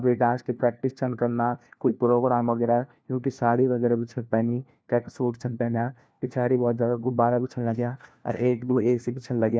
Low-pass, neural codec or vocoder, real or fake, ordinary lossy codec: none; codec, 16 kHz, 1 kbps, FunCodec, trained on LibriTTS, 50 frames a second; fake; none